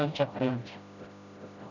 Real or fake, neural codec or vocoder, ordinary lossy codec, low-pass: fake; codec, 16 kHz, 0.5 kbps, FreqCodec, smaller model; none; 7.2 kHz